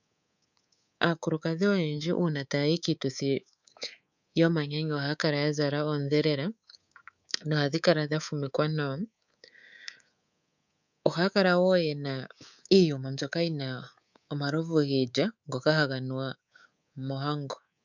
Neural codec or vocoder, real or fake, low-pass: codec, 24 kHz, 3.1 kbps, DualCodec; fake; 7.2 kHz